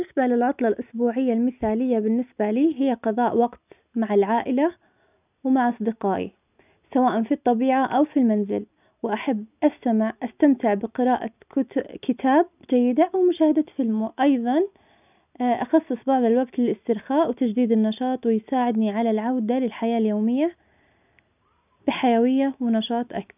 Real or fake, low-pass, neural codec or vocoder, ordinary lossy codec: real; 3.6 kHz; none; none